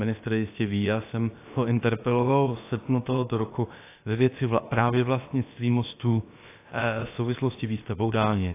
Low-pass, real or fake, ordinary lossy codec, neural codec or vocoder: 3.6 kHz; fake; AAC, 24 kbps; codec, 16 kHz, about 1 kbps, DyCAST, with the encoder's durations